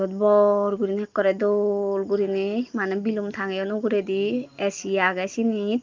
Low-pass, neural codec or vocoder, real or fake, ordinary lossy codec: 7.2 kHz; none; real; Opus, 32 kbps